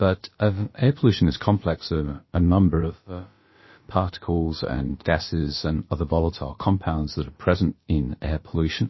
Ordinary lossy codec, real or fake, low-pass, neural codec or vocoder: MP3, 24 kbps; fake; 7.2 kHz; codec, 16 kHz, about 1 kbps, DyCAST, with the encoder's durations